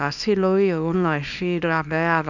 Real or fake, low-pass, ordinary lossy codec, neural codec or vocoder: fake; 7.2 kHz; none; codec, 24 kHz, 0.9 kbps, WavTokenizer, small release